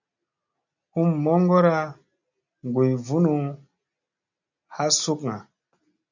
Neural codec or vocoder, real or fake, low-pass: none; real; 7.2 kHz